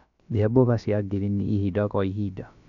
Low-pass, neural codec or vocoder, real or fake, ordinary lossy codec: 7.2 kHz; codec, 16 kHz, about 1 kbps, DyCAST, with the encoder's durations; fake; none